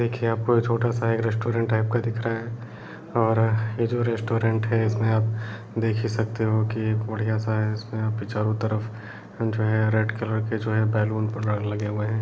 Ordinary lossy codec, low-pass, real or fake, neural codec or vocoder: none; none; real; none